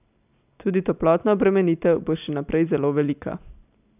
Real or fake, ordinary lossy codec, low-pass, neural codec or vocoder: real; none; 3.6 kHz; none